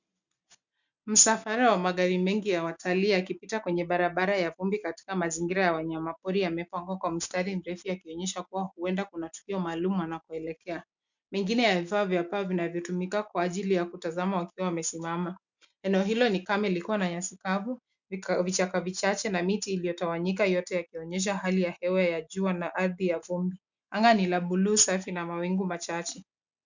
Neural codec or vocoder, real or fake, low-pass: none; real; 7.2 kHz